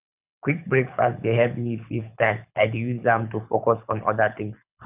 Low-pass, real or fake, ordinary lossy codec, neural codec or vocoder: 3.6 kHz; fake; none; codec, 24 kHz, 6 kbps, HILCodec